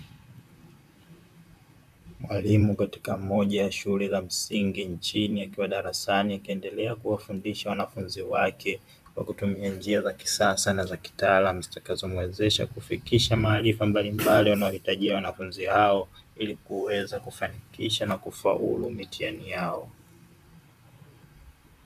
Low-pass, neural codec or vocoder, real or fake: 14.4 kHz; vocoder, 44.1 kHz, 128 mel bands, Pupu-Vocoder; fake